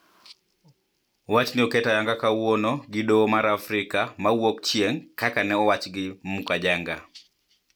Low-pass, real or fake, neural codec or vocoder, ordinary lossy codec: none; real; none; none